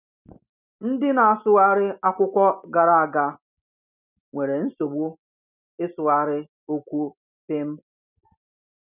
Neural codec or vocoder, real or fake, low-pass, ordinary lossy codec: none; real; 3.6 kHz; none